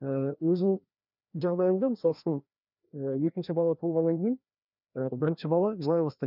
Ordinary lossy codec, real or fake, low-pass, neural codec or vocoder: none; fake; 5.4 kHz; codec, 16 kHz, 1 kbps, FreqCodec, larger model